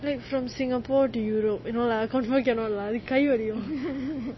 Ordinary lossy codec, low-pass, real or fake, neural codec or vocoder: MP3, 24 kbps; 7.2 kHz; real; none